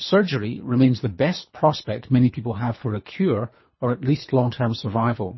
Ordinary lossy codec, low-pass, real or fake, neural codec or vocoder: MP3, 24 kbps; 7.2 kHz; fake; codec, 24 kHz, 3 kbps, HILCodec